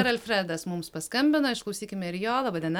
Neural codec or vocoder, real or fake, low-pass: none; real; 19.8 kHz